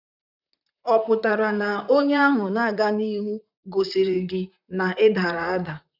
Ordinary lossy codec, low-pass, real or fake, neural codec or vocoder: none; 5.4 kHz; fake; vocoder, 44.1 kHz, 128 mel bands, Pupu-Vocoder